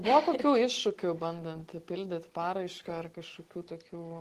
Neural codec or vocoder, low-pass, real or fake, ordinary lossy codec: none; 14.4 kHz; real; Opus, 16 kbps